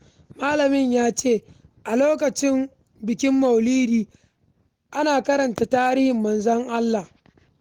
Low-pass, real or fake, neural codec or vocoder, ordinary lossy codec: 19.8 kHz; real; none; Opus, 24 kbps